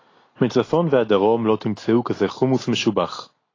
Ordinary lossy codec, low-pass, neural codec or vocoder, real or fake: AAC, 32 kbps; 7.2 kHz; none; real